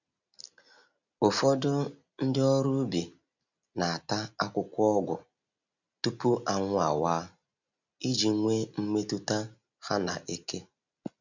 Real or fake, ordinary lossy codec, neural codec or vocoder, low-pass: real; none; none; 7.2 kHz